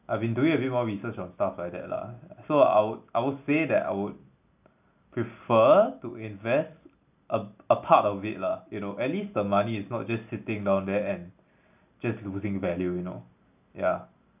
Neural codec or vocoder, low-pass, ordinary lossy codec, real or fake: none; 3.6 kHz; none; real